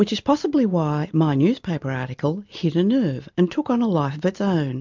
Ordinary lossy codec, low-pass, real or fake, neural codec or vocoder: MP3, 64 kbps; 7.2 kHz; real; none